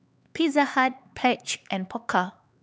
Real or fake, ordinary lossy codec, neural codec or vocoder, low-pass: fake; none; codec, 16 kHz, 4 kbps, X-Codec, HuBERT features, trained on LibriSpeech; none